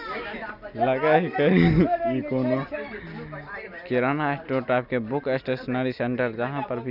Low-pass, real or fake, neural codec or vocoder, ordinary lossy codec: 5.4 kHz; real; none; none